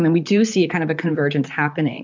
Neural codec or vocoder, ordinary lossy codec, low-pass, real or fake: vocoder, 44.1 kHz, 128 mel bands, Pupu-Vocoder; MP3, 64 kbps; 7.2 kHz; fake